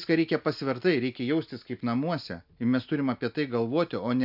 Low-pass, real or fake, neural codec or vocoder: 5.4 kHz; real; none